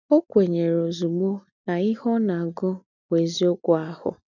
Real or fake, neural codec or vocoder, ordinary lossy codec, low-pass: real; none; none; 7.2 kHz